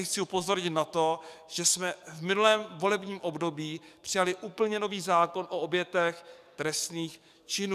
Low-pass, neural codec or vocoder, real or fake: 14.4 kHz; codec, 44.1 kHz, 7.8 kbps, DAC; fake